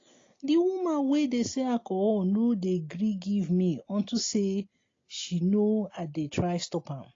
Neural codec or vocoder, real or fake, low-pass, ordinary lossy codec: none; real; 7.2 kHz; AAC, 32 kbps